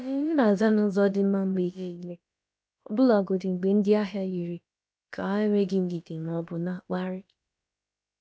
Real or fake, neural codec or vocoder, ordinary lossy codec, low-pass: fake; codec, 16 kHz, about 1 kbps, DyCAST, with the encoder's durations; none; none